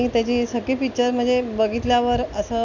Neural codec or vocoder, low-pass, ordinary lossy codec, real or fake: none; 7.2 kHz; none; real